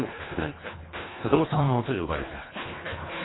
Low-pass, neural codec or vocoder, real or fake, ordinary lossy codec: 7.2 kHz; codec, 24 kHz, 1.5 kbps, HILCodec; fake; AAC, 16 kbps